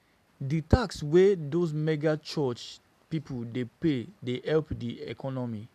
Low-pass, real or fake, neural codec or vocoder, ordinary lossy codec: 14.4 kHz; real; none; none